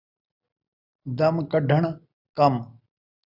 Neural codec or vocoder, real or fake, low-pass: none; real; 5.4 kHz